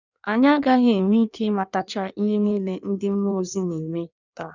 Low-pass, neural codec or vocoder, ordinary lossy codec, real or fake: 7.2 kHz; codec, 16 kHz in and 24 kHz out, 1.1 kbps, FireRedTTS-2 codec; none; fake